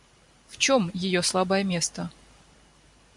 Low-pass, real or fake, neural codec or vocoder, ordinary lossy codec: 10.8 kHz; fake; vocoder, 44.1 kHz, 128 mel bands every 512 samples, BigVGAN v2; MP3, 64 kbps